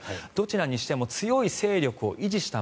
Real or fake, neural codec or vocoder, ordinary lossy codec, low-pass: real; none; none; none